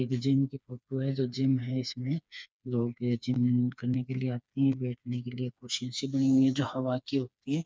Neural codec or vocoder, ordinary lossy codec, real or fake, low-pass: codec, 16 kHz, 4 kbps, FreqCodec, smaller model; none; fake; none